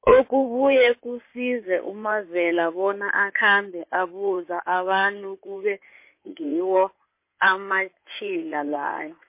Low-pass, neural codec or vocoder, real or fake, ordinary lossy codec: 3.6 kHz; codec, 16 kHz in and 24 kHz out, 2.2 kbps, FireRedTTS-2 codec; fake; MP3, 24 kbps